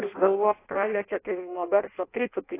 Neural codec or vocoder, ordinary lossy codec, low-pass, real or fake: codec, 16 kHz in and 24 kHz out, 0.6 kbps, FireRedTTS-2 codec; AAC, 24 kbps; 3.6 kHz; fake